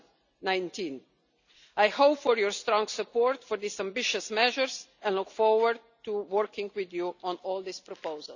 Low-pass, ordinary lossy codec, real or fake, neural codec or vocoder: 7.2 kHz; none; real; none